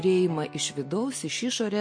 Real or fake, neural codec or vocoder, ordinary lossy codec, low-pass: real; none; MP3, 48 kbps; 9.9 kHz